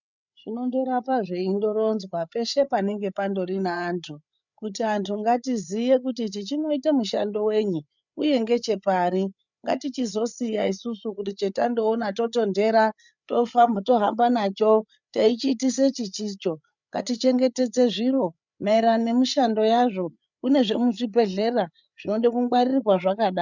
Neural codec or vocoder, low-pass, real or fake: codec, 16 kHz, 8 kbps, FreqCodec, larger model; 7.2 kHz; fake